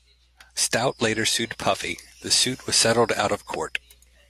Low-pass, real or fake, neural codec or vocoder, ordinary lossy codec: 14.4 kHz; real; none; AAC, 64 kbps